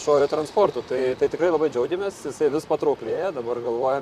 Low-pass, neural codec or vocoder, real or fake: 14.4 kHz; vocoder, 44.1 kHz, 128 mel bands, Pupu-Vocoder; fake